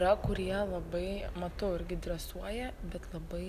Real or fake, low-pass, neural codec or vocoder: real; 14.4 kHz; none